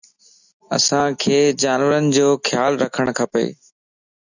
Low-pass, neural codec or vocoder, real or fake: 7.2 kHz; none; real